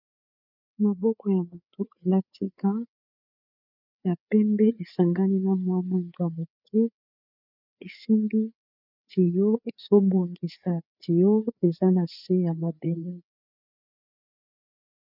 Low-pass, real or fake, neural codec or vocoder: 5.4 kHz; fake; codec, 16 kHz, 4 kbps, FreqCodec, larger model